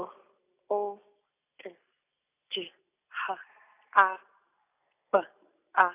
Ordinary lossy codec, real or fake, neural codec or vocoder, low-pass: none; real; none; 3.6 kHz